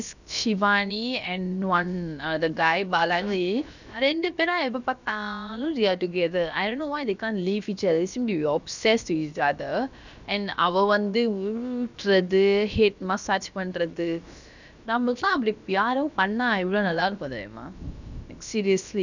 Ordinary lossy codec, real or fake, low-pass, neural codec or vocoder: none; fake; 7.2 kHz; codec, 16 kHz, about 1 kbps, DyCAST, with the encoder's durations